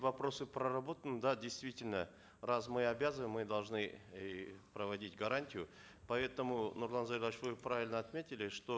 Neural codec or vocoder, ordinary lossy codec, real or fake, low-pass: none; none; real; none